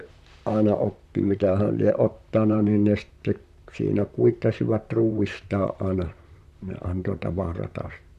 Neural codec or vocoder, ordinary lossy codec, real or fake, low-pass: vocoder, 44.1 kHz, 128 mel bands, Pupu-Vocoder; none; fake; 14.4 kHz